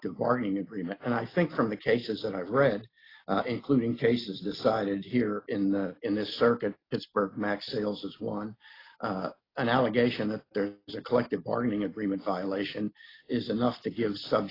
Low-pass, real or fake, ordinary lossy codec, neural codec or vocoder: 5.4 kHz; real; AAC, 24 kbps; none